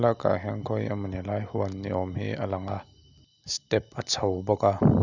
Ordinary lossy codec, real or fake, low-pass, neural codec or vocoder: none; real; 7.2 kHz; none